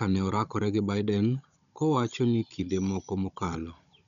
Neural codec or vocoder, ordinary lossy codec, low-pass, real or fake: codec, 16 kHz, 16 kbps, FunCodec, trained on Chinese and English, 50 frames a second; none; 7.2 kHz; fake